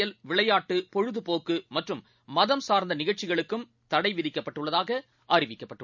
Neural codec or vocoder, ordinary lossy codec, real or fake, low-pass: none; none; real; 7.2 kHz